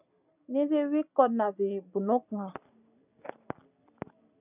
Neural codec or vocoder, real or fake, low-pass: none; real; 3.6 kHz